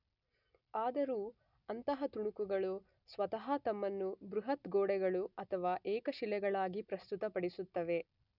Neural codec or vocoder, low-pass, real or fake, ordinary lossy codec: none; 5.4 kHz; real; none